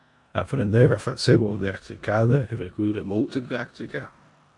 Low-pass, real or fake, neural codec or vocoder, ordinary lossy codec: 10.8 kHz; fake; codec, 16 kHz in and 24 kHz out, 0.9 kbps, LongCat-Audio-Codec, four codebook decoder; AAC, 64 kbps